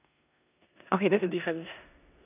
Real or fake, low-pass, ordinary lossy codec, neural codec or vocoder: fake; 3.6 kHz; none; codec, 16 kHz in and 24 kHz out, 0.9 kbps, LongCat-Audio-Codec, four codebook decoder